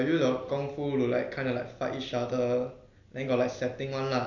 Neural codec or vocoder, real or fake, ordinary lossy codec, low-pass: none; real; none; 7.2 kHz